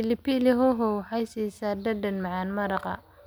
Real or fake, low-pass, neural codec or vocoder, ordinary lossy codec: real; none; none; none